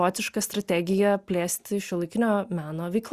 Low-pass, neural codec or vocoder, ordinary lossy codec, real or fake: 14.4 kHz; none; Opus, 64 kbps; real